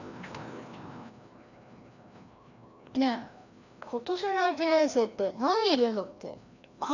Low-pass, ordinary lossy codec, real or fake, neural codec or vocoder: 7.2 kHz; none; fake; codec, 16 kHz, 1 kbps, FreqCodec, larger model